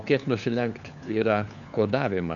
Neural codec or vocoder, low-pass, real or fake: codec, 16 kHz, 4 kbps, FunCodec, trained on LibriTTS, 50 frames a second; 7.2 kHz; fake